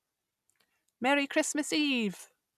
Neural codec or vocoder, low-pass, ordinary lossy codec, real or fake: none; 14.4 kHz; none; real